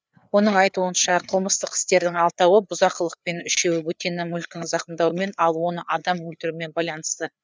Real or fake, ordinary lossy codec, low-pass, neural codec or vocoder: fake; none; none; codec, 16 kHz, 4 kbps, FreqCodec, larger model